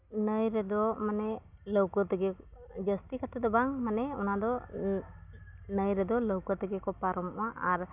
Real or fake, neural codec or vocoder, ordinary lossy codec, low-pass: real; none; none; 3.6 kHz